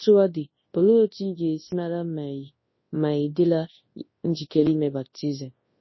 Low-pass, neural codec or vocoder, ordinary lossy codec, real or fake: 7.2 kHz; codec, 24 kHz, 0.9 kbps, WavTokenizer, large speech release; MP3, 24 kbps; fake